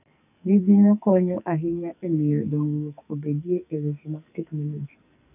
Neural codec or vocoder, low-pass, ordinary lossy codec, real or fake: codec, 44.1 kHz, 2.6 kbps, SNAC; 3.6 kHz; none; fake